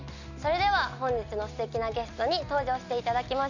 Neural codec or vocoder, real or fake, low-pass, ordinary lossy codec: none; real; 7.2 kHz; none